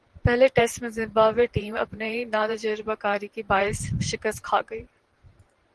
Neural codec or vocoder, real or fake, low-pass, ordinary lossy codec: vocoder, 44.1 kHz, 128 mel bands every 512 samples, BigVGAN v2; fake; 10.8 kHz; Opus, 16 kbps